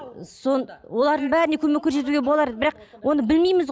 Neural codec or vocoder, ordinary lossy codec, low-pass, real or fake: none; none; none; real